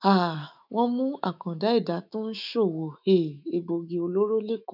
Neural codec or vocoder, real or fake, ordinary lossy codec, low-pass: autoencoder, 48 kHz, 128 numbers a frame, DAC-VAE, trained on Japanese speech; fake; none; 5.4 kHz